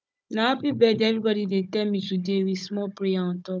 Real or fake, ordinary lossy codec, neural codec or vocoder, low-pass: fake; none; codec, 16 kHz, 16 kbps, FunCodec, trained on Chinese and English, 50 frames a second; none